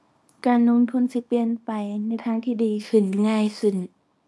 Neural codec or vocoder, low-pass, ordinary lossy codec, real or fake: codec, 24 kHz, 0.9 kbps, WavTokenizer, small release; none; none; fake